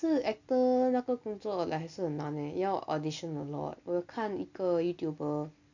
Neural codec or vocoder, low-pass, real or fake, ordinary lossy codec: none; 7.2 kHz; real; none